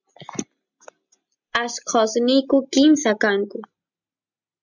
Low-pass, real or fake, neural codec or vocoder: 7.2 kHz; real; none